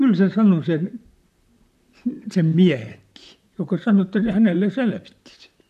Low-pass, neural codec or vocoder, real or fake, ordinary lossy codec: 14.4 kHz; vocoder, 44.1 kHz, 128 mel bands, Pupu-Vocoder; fake; none